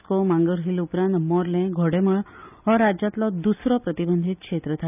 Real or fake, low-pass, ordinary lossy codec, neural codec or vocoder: real; 3.6 kHz; none; none